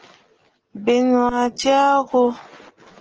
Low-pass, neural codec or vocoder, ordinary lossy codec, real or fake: 7.2 kHz; none; Opus, 16 kbps; real